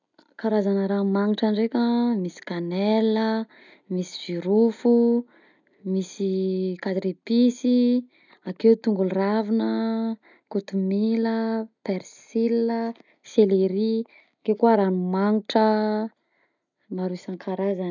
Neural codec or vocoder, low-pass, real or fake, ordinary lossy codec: none; 7.2 kHz; real; none